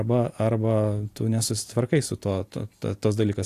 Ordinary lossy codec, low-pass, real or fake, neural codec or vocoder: AAC, 64 kbps; 14.4 kHz; real; none